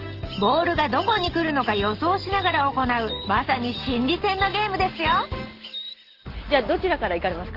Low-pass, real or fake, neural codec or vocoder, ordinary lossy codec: 5.4 kHz; real; none; Opus, 16 kbps